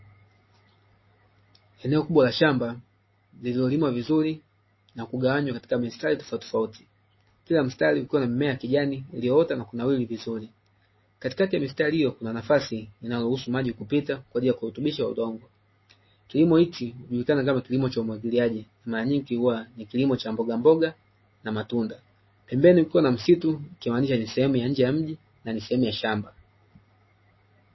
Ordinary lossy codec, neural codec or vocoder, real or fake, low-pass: MP3, 24 kbps; none; real; 7.2 kHz